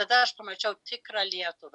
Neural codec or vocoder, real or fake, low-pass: none; real; 10.8 kHz